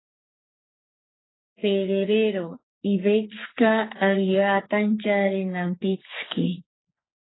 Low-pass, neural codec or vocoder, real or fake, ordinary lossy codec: 7.2 kHz; codec, 44.1 kHz, 2.6 kbps, SNAC; fake; AAC, 16 kbps